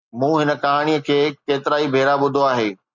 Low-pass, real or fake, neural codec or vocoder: 7.2 kHz; real; none